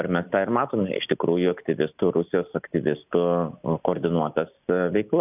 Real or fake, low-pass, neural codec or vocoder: real; 3.6 kHz; none